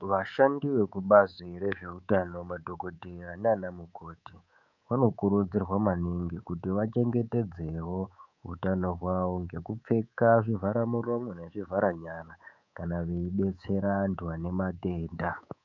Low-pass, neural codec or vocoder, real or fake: 7.2 kHz; codec, 24 kHz, 3.1 kbps, DualCodec; fake